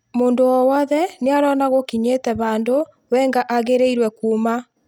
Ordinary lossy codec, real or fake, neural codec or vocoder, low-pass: none; real; none; 19.8 kHz